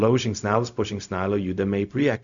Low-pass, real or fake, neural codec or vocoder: 7.2 kHz; fake; codec, 16 kHz, 0.4 kbps, LongCat-Audio-Codec